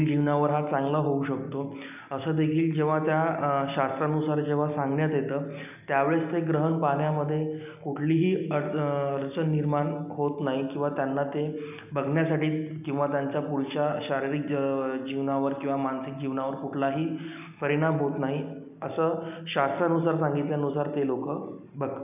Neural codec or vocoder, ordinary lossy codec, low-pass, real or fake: none; none; 3.6 kHz; real